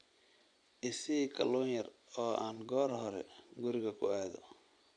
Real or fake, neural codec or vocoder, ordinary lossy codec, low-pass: fake; vocoder, 24 kHz, 100 mel bands, Vocos; none; 9.9 kHz